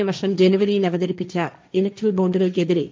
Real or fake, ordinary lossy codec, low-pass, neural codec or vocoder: fake; none; none; codec, 16 kHz, 1.1 kbps, Voila-Tokenizer